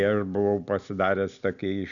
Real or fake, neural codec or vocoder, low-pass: real; none; 7.2 kHz